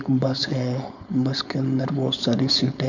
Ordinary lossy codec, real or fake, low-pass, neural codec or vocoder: none; fake; 7.2 kHz; codec, 16 kHz, 4.8 kbps, FACodec